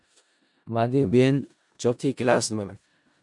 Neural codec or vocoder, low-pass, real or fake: codec, 16 kHz in and 24 kHz out, 0.4 kbps, LongCat-Audio-Codec, four codebook decoder; 10.8 kHz; fake